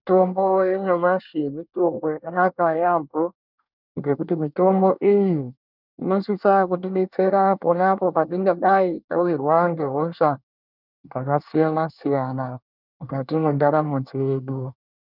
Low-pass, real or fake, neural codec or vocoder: 5.4 kHz; fake; codec, 24 kHz, 1 kbps, SNAC